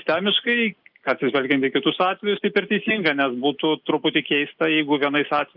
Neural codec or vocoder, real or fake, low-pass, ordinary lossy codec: none; real; 5.4 kHz; Opus, 24 kbps